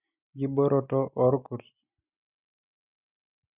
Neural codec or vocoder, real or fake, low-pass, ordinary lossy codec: none; real; 3.6 kHz; none